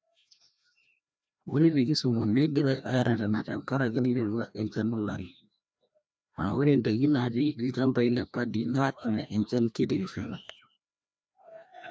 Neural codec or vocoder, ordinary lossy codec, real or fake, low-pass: codec, 16 kHz, 1 kbps, FreqCodec, larger model; none; fake; none